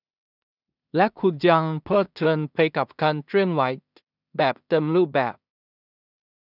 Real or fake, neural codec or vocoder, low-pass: fake; codec, 16 kHz in and 24 kHz out, 0.4 kbps, LongCat-Audio-Codec, two codebook decoder; 5.4 kHz